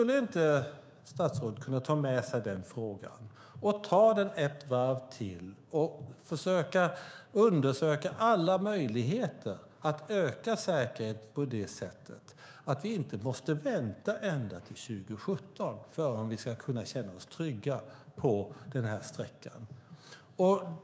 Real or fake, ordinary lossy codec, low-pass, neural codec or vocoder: fake; none; none; codec, 16 kHz, 6 kbps, DAC